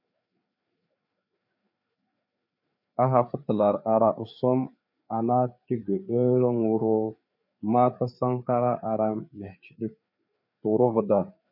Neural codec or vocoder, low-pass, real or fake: codec, 16 kHz, 4 kbps, FreqCodec, larger model; 5.4 kHz; fake